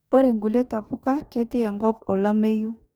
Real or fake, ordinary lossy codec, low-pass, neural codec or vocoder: fake; none; none; codec, 44.1 kHz, 2.6 kbps, DAC